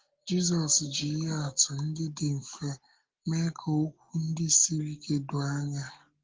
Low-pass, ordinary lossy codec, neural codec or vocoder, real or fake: 7.2 kHz; Opus, 16 kbps; none; real